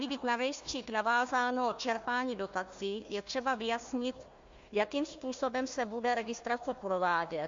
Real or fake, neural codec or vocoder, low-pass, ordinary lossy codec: fake; codec, 16 kHz, 1 kbps, FunCodec, trained on Chinese and English, 50 frames a second; 7.2 kHz; MP3, 48 kbps